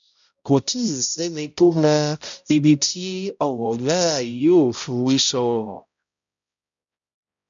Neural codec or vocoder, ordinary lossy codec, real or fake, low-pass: codec, 16 kHz, 0.5 kbps, X-Codec, HuBERT features, trained on balanced general audio; MP3, 64 kbps; fake; 7.2 kHz